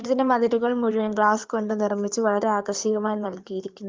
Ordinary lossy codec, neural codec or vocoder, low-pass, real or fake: Opus, 32 kbps; codec, 16 kHz in and 24 kHz out, 2.2 kbps, FireRedTTS-2 codec; 7.2 kHz; fake